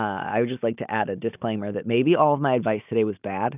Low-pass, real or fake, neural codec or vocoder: 3.6 kHz; real; none